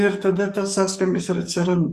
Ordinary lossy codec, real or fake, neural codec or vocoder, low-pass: Opus, 64 kbps; fake; codec, 44.1 kHz, 2.6 kbps, SNAC; 14.4 kHz